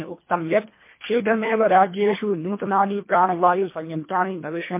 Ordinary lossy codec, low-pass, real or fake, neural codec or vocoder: MP3, 24 kbps; 3.6 kHz; fake; codec, 24 kHz, 1.5 kbps, HILCodec